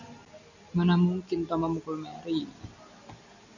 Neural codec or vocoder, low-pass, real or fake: none; 7.2 kHz; real